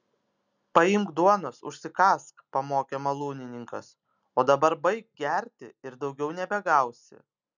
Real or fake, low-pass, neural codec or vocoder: real; 7.2 kHz; none